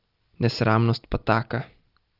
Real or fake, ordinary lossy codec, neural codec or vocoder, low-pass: real; Opus, 32 kbps; none; 5.4 kHz